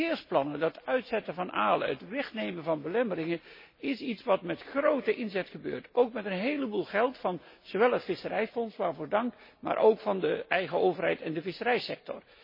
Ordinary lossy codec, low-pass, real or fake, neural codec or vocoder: MP3, 24 kbps; 5.4 kHz; real; none